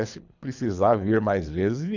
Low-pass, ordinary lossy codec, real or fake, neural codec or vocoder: 7.2 kHz; none; fake; codec, 24 kHz, 3 kbps, HILCodec